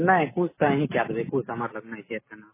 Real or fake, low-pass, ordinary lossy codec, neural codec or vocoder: real; 3.6 kHz; MP3, 16 kbps; none